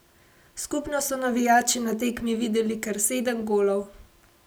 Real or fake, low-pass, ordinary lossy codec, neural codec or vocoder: real; none; none; none